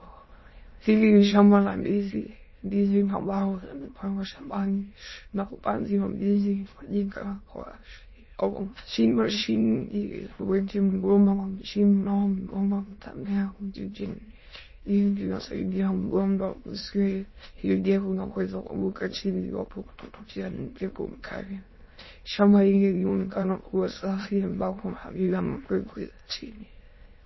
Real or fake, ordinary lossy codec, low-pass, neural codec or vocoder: fake; MP3, 24 kbps; 7.2 kHz; autoencoder, 22.05 kHz, a latent of 192 numbers a frame, VITS, trained on many speakers